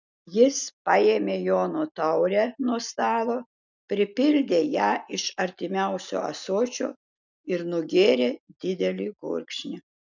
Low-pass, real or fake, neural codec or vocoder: 7.2 kHz; real; none